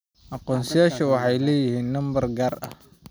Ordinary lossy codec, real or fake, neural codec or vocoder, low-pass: none; real; none; none